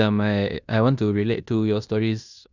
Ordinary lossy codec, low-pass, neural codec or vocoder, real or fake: none; 7.2 kHz; codec, 16 kHz in and 24 kHz out, 0.9 kbps, LongCat-Audio-Codec, fine tuned four codebook decoder; fake